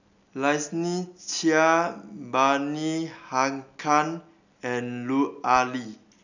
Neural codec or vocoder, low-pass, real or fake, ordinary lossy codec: none; 7.2 kHz; real; none